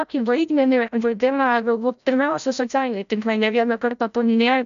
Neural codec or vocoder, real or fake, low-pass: codec, 16 kHz, 0.5 kbps, FreqCodec, larger model; fake; 7.2 kHz